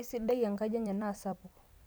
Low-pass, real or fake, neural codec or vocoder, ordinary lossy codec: none; fake; vocoder, 44.1 kHz, 128 mel bands every 256 samples, BigVGAN v2; none